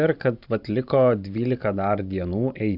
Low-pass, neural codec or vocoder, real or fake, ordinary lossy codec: 5.4 kHz; vocoder, 44.1 kHz, 128 mel bands every 512 samples, BigVGAN v2; fake; AAC, 48 kbps